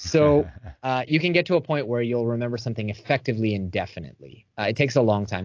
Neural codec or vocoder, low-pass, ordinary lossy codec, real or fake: none; 7.2 kHz; AAC, 48 kbps; real